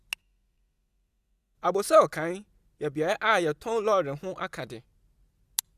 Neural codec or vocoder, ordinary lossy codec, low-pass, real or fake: none; Opus, 64 kbps; 14.4 kHz; real